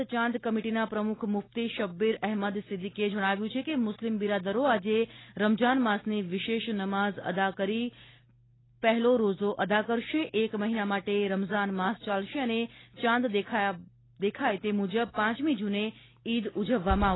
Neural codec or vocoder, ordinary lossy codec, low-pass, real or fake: none; AAC, 16 kbps; 7.2 kHz; real